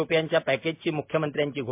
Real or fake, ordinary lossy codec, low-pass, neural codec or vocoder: real; MP3, 32 kbps; 3.6 kHz; none